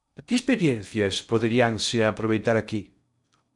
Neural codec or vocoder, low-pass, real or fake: codec, 16 kHz in and 24 kHz out, 0.6 kbps, FocalCodec, streaming, 2048 codes; 10.8 kHz; fake